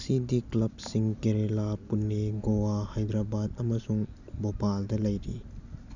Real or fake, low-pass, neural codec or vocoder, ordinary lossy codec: real; 7.2 kHz; none; none